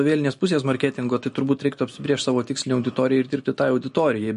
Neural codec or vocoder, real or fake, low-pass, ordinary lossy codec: none; real; 10.8 kHz; MP3, 48 kbps